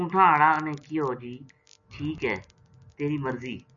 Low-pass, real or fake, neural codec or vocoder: 7.2 kHz; real; none